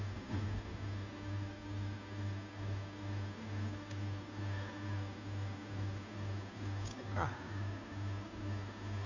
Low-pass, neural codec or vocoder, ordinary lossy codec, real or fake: 7.2 kHz; codec, 16 kHz, 2 kbps, FunCodec, trained on Chinese and English, 25 frames a second; none; fake